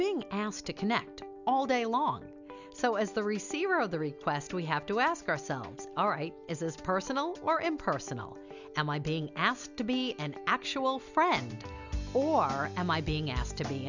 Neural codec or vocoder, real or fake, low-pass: none; real; 7.2 kHz